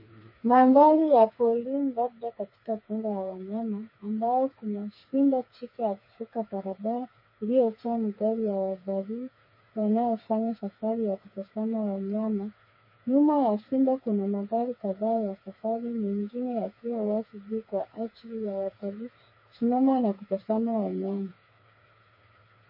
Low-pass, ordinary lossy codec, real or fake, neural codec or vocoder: 5.4 kHz; MP3, 24 kbps; fake; codec, 16 kHz, 4 kbps, FreqCodec, smaller model